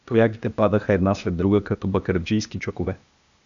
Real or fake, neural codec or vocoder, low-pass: fake; codec, 16 kHz, 0.8 kbps, ZipCodec; 7.2 kHz